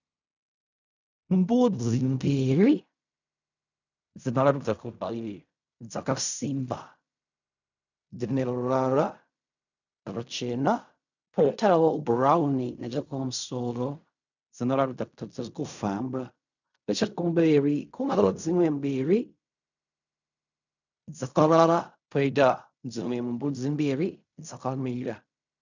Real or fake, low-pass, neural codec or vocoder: fake; 7.2 kHz; codec, 16 kHz in and 24 kHz out, 0.4 kbps, LongCat-Audio-Codec, fine tuned four codebook decoder